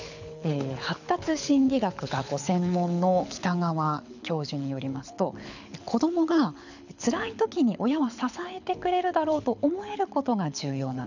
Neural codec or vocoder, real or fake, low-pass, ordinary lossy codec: codec, 24 kHz, 6 kbps, HILCodec; fake; 7.2 kHz; none